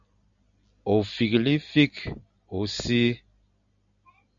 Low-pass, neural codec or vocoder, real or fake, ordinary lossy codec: 7.2 kHz; none; real; MP3, 64 kbps